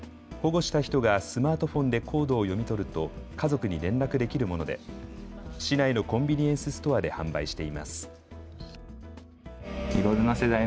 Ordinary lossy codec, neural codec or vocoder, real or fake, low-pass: none; none; real; none